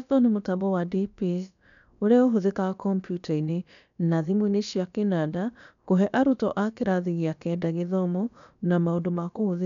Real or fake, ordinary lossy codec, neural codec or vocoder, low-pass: fake; none; codec, 16 kHz, about 1 kbps, DyCAST, with the encoder's durations; 7.2 kHz